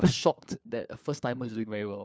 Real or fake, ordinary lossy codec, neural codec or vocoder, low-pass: fake; none; codec, 16 kHz, 4 kbps, FunCodec, trained on LibriTTS, 50 frames a second; none